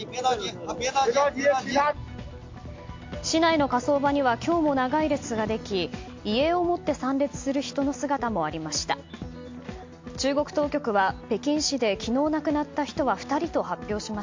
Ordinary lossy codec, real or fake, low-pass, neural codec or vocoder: MP3, 48 kbps; real; 7.2 kHz; none